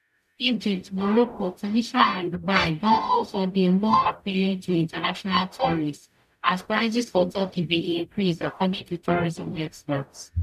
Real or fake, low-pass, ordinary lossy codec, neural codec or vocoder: fake; 14.4 kHz; none; codec, 44.1 kHz, 0.9 kbps, DAC